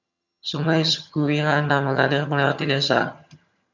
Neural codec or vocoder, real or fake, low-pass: vocoder, 22.05 kHz, 80 mel bands, HiFi-GAN; fake; 7.2 kHz